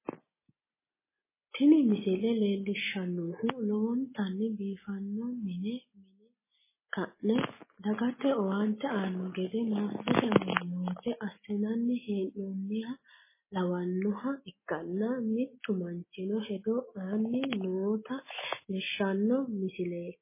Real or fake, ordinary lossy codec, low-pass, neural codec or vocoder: real; MP3, 16 kbps; 3.6 kHz; none